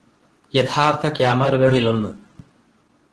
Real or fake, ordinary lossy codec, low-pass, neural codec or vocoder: fake; Opus, 16 kbps; 10.8 kHz; codec, 24 kHz, 0.9 kbps, WavTokenizer, medium speech release version 2